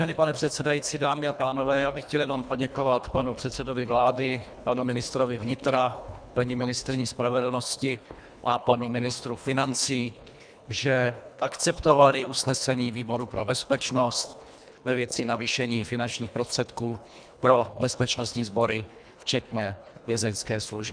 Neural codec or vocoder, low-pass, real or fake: codec, 24 kHz, 1.5 kbps, HILCodec; 9.9 kHz; fake